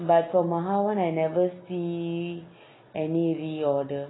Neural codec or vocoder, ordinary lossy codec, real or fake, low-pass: none; AAC, 16 kbps; real; 7.2 kHz